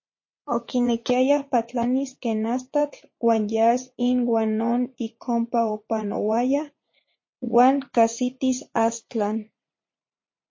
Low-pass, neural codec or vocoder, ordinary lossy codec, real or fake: 7.2 kHz; vocoder, 44.1 kHz, 80 mel bands, Vocos; MP3, 32 kbps; fake